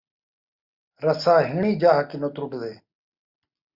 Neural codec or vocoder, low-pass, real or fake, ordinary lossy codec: none; 5.4 kHz; real; Opus, 64 kbps